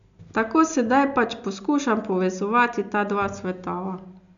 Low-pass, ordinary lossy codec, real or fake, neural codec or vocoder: 7.2 kHz; none; real; none